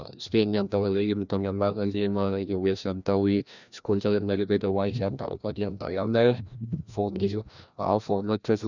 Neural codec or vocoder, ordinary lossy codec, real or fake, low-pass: codec, 16 kHz, 1 kbps, FreqCodec, larger model; none; fake; 7.2 kHz